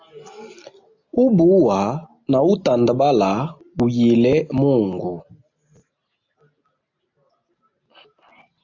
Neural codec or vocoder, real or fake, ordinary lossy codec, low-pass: none; real; AAC, 48 kbps; 7.2 kHz